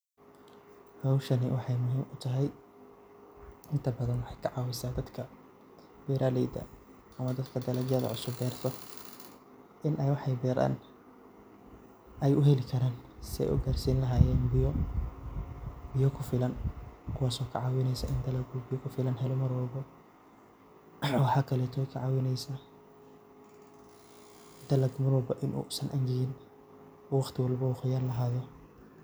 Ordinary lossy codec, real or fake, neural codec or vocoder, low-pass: none; real; none; none